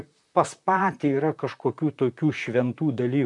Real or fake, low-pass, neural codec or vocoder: fake; 10.8 kHz; vocoder, 44.1 kHz, 128 mel bands, Pupu-Vocoder